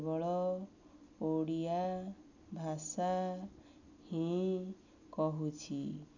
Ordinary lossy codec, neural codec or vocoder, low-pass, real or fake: none; none; 7.2 kHz; real